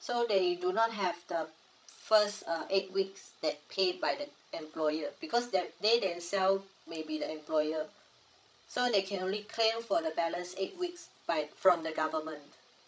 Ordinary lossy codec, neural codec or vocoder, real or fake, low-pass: none; codec, 16 kHz, 16 kbps, FreqCodec, larger model; fake; none